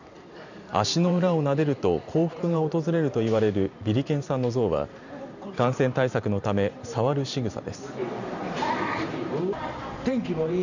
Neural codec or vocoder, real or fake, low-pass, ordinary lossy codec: vocoder, 44.1 kHz, 128 mel bands every 512 samples, BigVGAN v2; fake; 7.2 kHz; none